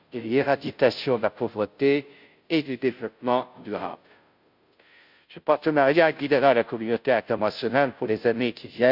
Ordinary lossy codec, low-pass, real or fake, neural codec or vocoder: none; 5.4 kHz; fake; codec, 16 kHz, 0.5 kbps, FunCodec, trained on Chinese and English, 25 frames a second